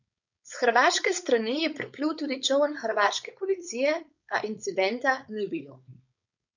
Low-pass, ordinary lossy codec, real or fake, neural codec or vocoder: 7.2 kHz; none; fake; codec, 16 kHz, 4.8 kbps, FACodec